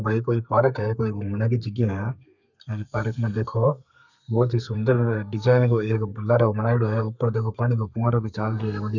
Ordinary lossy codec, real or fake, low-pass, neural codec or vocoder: none; fake; 7.2 kHz; codec, 32 kHz, 1.9 kbps, SNAC